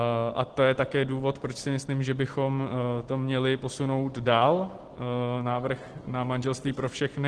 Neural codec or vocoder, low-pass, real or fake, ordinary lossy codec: none; 10.8 kHz; real; Opus, 16 kbps